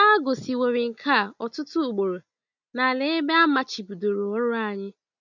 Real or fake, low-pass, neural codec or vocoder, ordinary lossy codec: real; 7.2 kHz; none; none